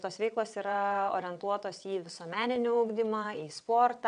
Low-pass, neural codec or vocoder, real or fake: 9.9 kHz; vocoder, 22.05 kHz, 80 mel bands, WaveNeXt; fake